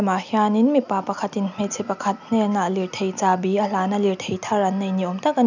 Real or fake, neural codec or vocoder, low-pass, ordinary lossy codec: real; none; 7.2 kHz; none